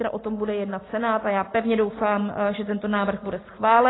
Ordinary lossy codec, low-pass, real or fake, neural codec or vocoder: AAC, 16 kbps; 7.2 kHz; real; none